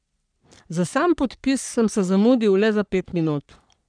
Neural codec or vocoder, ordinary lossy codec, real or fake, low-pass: codec, 44.1 kHz, 3.4 kbps, Pupu-Codec; none; fake; 9.9 kHz